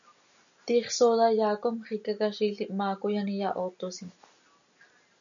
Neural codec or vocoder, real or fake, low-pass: none; real; 7.2 kHz